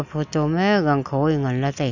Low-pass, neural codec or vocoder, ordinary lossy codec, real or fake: 7.2 kHz; none; none; real